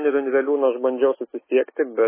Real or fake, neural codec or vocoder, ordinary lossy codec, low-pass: fake; autoencoder, 48 kHz, 128 numbers a frame, DAC-VAE, trained on Japanese speech; MP3, 16 kbps; 3.6 kHz